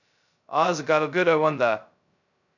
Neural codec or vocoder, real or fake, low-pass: codec, 16 kHz, 0.2 kbps, FocalCodec; fake; 7.2 kHz